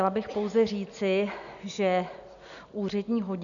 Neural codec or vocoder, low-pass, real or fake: none; 7.2 kHz; real